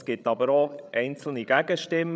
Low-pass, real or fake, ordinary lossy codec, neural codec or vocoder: none; fake; none; codec, 16 kHz, 16 kbps, FreqCodec, larger model